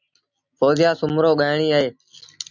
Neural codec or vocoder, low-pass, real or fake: none; 7.2 kHz; real